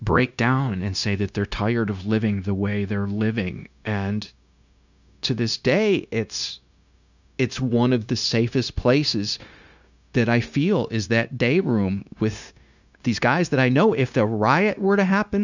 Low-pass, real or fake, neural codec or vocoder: 7.2 kHz; fake; codec, 16 kHz, 0.9 kbps, LongCat-Audio-Codec